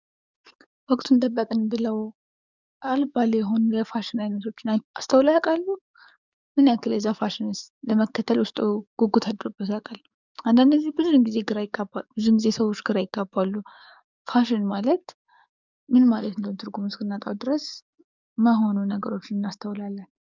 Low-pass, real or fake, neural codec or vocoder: 7.2 kHz; fake; codec, 44.1 kHz, 7.8 kbps, DAC